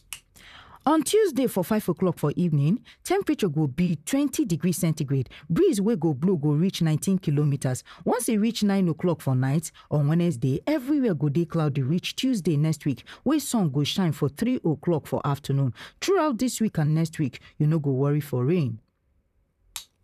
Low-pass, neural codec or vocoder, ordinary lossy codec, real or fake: 14.4 kHz; vocoder, 44.1 kHz, 128 mel bands, Pupu-Vocoder; none; fake